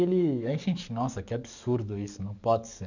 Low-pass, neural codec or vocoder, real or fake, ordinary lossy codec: 7.2 kHz; codec, 44.1 kHz, 7.8 kbps, DAC; fake; none